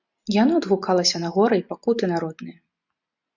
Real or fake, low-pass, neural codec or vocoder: real; 7.2 kHz; none